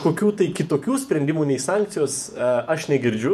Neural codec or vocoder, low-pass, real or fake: vocoder, 48 kHz, 128 mel bands, Vocos; 14.4 kHz; fake